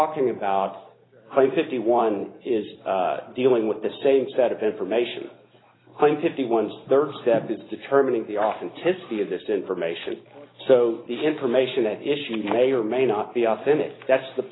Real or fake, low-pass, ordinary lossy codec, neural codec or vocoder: real; 7.2 kHz; AAC, 16 kbps; none